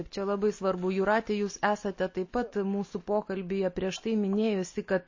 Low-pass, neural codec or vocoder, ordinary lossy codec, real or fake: 7.2 kHz; none; MP3, 32 kbps; real